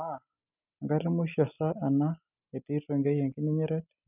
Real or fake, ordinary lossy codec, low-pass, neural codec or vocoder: real; none; 3.6 kHz; none